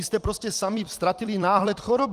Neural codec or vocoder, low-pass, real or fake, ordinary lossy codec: vocoder, 44.1 kHz, 128 mel bands every 256 samples, BigVGAN v2; 14.4 kHz; fake; Opus, 24 kbps